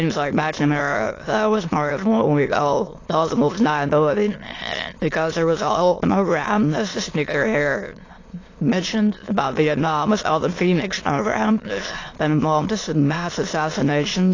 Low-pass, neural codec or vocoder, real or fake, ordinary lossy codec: 7.2 kHz; autoencoder, 22.05 kHz, a latent of 192 numbers a frame, VITS, trained on many speakers; fake; AAC, 32 kbps